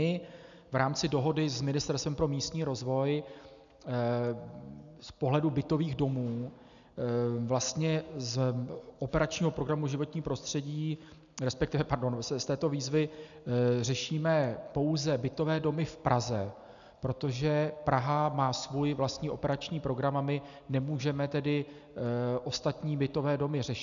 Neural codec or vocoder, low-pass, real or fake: none; 7.2 kHz; real